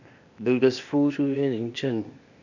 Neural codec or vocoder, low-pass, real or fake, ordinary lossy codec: codec, 16 kHz, 0.8 kbps, ZipCodec; 7.2 kHz; fake; none